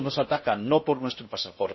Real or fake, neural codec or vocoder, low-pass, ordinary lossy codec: fake; codec, 16 kHz in and 24 kHz out, 0.6 kbps, FocalCodec, streaming, 4096 codes; 7.2 kHz; MP3, 24 kbps